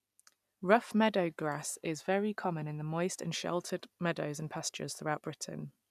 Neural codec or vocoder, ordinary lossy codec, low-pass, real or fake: none; none; 14.4 kHz; real